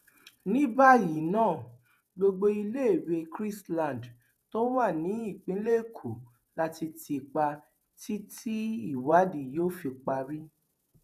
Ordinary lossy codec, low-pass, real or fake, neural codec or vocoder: none; 14.4 kHz; fake; vocoder, 48 kHz, 128 mel bands, Vocos